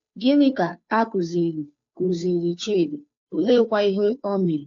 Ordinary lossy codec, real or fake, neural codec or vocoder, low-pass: AAC, 48 kbps; fake; codec, 16 kHz, 2 kbps, FunCodec, trained on Chinese and English, 25 frames a second; 7.2 kHz